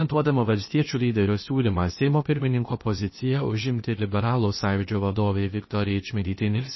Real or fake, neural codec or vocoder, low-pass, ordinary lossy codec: fake; codec, 16 kHz, 0.8 kbps, ZipCodec; 7.2 kHz; MP3, 24 kbps